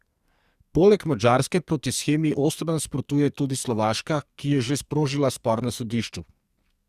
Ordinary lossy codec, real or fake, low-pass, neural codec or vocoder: Opus, 64 kbps; fake; 14.4 kHz; codec, 44.1 kHz, 2.6 kbps, SNAC